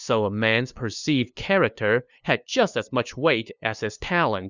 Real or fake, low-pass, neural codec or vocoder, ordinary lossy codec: fake; 7.2 kHz; codec, 16 kHz, 2 kbps, FunCodec, trained on LibriTTS, 25 frames a second; Opus, 64 kbps